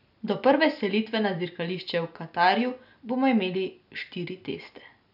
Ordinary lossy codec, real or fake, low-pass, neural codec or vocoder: none; real; 5.4 kHz; none